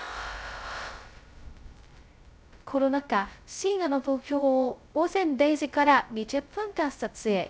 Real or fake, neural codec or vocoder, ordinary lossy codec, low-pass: fake; codec, 16 kHz, 0.2 kbps, FocalCodec; none; none